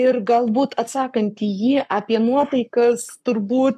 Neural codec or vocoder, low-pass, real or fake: codec, 44.1 kHz, 7.8 kbps, Pupu-Codec; 14.4 kHz; fake